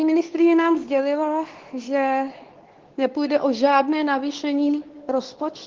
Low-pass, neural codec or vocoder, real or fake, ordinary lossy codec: 7.2 kHz; codec, 24 kHz, 0.9 kbps, WavTokenizer, medium speech release version 2; fake; Opus, 16 kbps